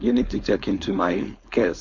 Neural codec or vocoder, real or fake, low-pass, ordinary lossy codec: codec, 16 kHz, 4.8 kbps, FACodec; fake; 7.2 kHz; MP3, 48 kbps